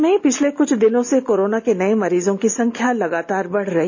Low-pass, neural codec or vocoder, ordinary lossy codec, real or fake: 7.2 kHz; none; none; real